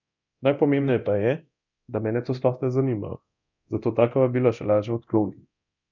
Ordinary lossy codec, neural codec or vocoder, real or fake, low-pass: none; codec, 24 kHz, 0.9 kbps, DualCodec; fake; 7.2 kHz